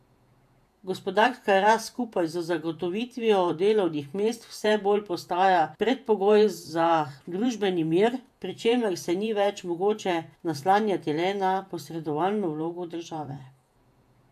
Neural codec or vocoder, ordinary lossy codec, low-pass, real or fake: vocoder, 48 kHz, 128 mel bands, Vocos; AAC, 96 kbps; 14.4 kHz; fake